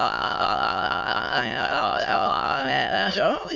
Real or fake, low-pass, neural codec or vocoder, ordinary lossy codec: fake; 7.2 kHz; autoencoder, 22.05 kHz, a latent of 192 numbers a frame, VITS, trained on many speakers; MP3, 64 kbps